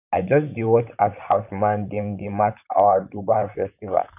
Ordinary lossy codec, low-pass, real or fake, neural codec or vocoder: none; 3.6 kHz; fake; vocoder, 44.1 kHz, 128 mel bands, Pupu-Vocoder